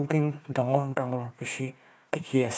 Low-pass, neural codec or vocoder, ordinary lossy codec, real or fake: none; codec, 16 kHz, 1 kbps, FunCodec, trained on Chinese and English, 50 frames a second; none; fake